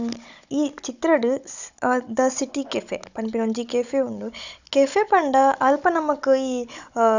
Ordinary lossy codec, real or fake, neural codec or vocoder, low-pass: none; fake; codec, 16 kHz, 16 kbps, FunCodec, trained on Chinese and English, 50 frames a second; 7.2 kHz